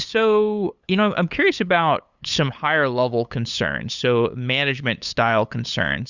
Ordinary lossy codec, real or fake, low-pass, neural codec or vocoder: Opus, 64 kbps; fake; 7.2 kHz; codec, 16 kHz, 8 kbps, FunCodec, trained on LibriTTS, 25 frames a second